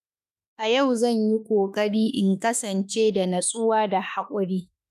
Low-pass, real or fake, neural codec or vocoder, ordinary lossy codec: 14.4 kHz; fake; autoencoder, 48 kHz, 32 numbers a frame, DAC-VAE, trained on Japanese speech; none